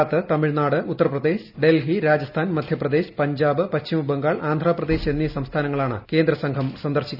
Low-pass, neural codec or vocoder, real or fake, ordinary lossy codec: 5.4 kHz; none; real; none